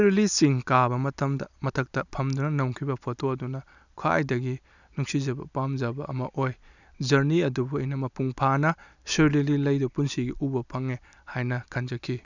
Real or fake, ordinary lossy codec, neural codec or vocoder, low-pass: real; none; none; 7.2 kHz